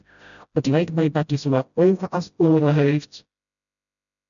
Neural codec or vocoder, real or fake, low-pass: codec, 16 kHz, 0.5 kbps, FreqCodec, smaller model; fake; 7.2 kHz